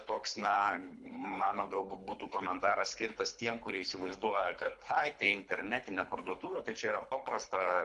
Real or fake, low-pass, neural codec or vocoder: fake; 10.8 kHz; codec, 24 kHz, 3 kbps, HILCodec